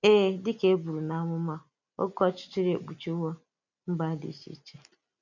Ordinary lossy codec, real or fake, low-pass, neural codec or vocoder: none; real; 7.2 kHz; none